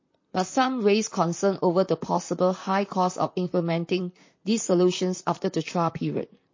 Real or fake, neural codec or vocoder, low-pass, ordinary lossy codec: fake; vocoder, 44.1 kHz, 128 mel bands, Pupu-Vocoder; 7.2 kHz; MP3, 32 kbps